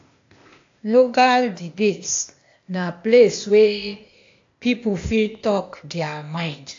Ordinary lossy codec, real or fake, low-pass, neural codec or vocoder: AAC, 48 kbps; fake; 7.2 kHz; codec, 16 kHz, 0.8 kbps, ZipCodec